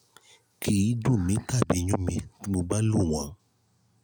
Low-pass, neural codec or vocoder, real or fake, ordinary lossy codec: none; none; real; none